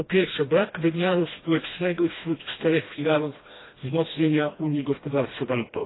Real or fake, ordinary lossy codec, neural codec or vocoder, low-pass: fake; AAC, 16 kbps; codec, 16 kHz, 1 kbps, FreqCodec, smaller model; 7.2 kHz